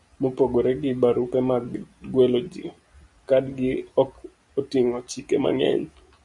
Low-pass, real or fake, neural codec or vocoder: 10.8 kHz; real; none